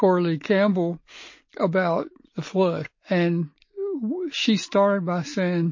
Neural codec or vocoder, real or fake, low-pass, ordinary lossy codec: none; real; 7.2 kHz; MP3, 32 kbps